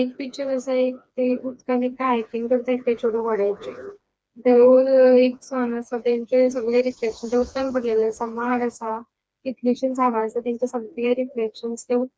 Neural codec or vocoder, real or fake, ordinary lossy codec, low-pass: codec, 16 kHz, 2 kbps, FreqCodec, smaller model; fake; none; none